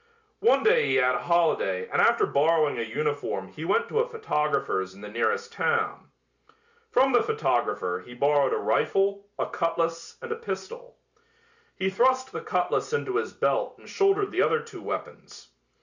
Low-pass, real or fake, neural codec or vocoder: 7.2 kHz; real; none